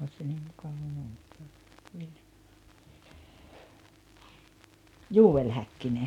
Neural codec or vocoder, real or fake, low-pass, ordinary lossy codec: none; real; 19.8 kHz; none